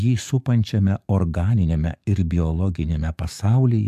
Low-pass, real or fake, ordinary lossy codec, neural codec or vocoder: 14.4 kHz; fake; MP3, 96 kbps; codec, 44.1 kHz, 7.8 kbps, DAC